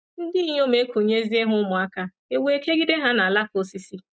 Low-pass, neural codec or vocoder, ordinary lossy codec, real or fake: none; none; none; real